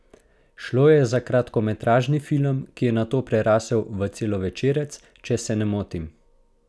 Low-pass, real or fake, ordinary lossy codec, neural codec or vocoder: none; real; none; none